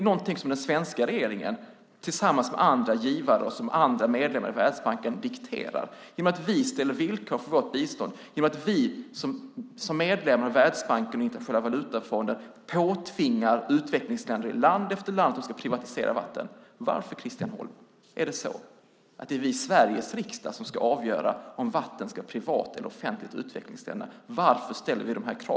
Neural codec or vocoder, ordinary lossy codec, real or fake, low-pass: none; none; real; none